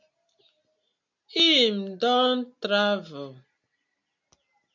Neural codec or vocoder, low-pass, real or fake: none; 7.2 kHz; real